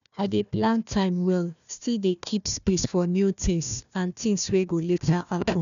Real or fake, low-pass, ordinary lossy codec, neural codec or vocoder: fake; 7.2 kHz; none; codec, 16 kHz, 1 kbps, FunCodec, trained on Chinese and English, 50 frames a second